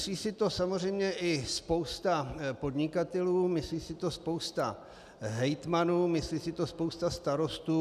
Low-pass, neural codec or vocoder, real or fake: 14.4 kHz; none; real